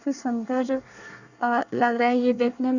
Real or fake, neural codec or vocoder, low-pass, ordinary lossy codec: fake; codec, 24 kHz, 1 kbps, SNAC; 7.2 kHz; none